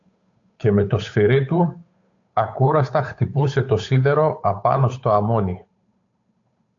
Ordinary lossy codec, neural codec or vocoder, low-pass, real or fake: AAC, 48 kbps; codec, 16 kHz, 8 kbps, FunCodec, trained on Chinese and English, 25 frames a second; 7.2 kHz; fake